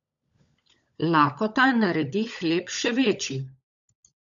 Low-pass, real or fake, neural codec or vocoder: 7.2 kHz; fake; codec, 16 kHz, 16 kbps, FunCodec, trained on LibriTTS, 50 frames a second